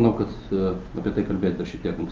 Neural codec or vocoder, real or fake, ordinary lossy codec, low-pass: none; real; Opus, 32 kbps; 7.2 kHz